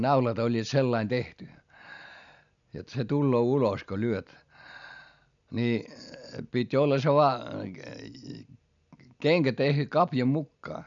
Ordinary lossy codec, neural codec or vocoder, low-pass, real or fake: none; none; 7.2 kHz; real